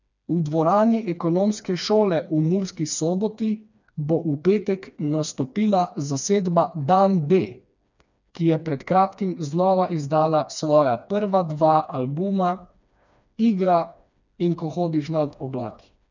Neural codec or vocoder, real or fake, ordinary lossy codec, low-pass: codec, 16 kHz, 2 kbps, FreqCodec, smaller model; fake; none; 7.2 kHz